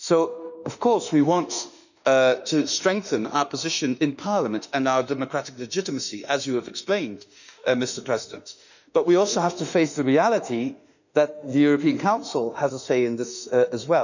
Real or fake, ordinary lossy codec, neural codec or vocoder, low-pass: fake; none; autoencoder, 48 kHz, 32 numbers a frame, DAC-VAE, trained on Japanese speech; 7.2 kHz